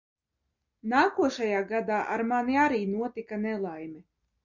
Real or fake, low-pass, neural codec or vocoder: real; 7.2 kHz; none